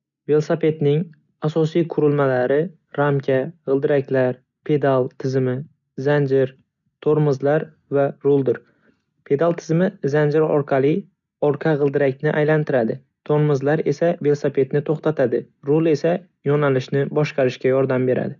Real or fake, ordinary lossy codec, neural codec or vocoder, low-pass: real; none; none; 7.2 kHz